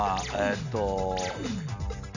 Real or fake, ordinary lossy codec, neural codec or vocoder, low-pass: real; none; none; 7.2 kHz